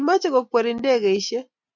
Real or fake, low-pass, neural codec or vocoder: real; 7.2 kHz; none